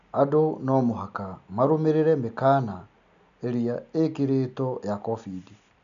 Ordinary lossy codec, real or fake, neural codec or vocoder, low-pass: none; real; none; 7.2 kHz